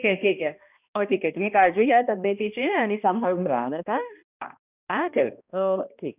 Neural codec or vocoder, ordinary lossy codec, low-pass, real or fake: codec, 16 kHz, 1 kbps, X-Codec, HuBERT features, trained on balanced general audio; none; 3.6 kHz; fake